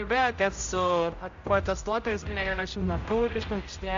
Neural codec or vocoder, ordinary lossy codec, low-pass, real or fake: codec, 16 kHz, 0.5 kbps, X-Codec, HuBERT features, trained on general audio; AAC, 48 kbps; 7.2 kHz; fake